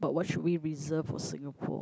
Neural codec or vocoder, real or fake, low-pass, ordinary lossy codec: none; real; none; none